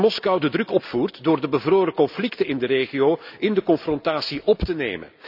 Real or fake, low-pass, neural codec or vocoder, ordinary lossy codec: real; 5.4 kHz; none; none